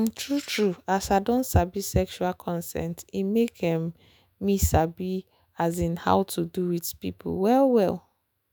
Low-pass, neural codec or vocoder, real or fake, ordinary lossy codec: none; autoencoder, 48 kHz, 128 numbers a frame, DAC-VAE, trained on Japanese speech; fake; none